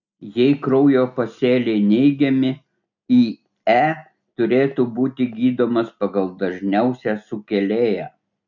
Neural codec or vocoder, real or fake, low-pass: none; real; 7.2 kHz